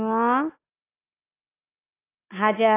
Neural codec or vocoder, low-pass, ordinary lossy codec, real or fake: none; 3.6 kHz; AAC, 24 kbps; real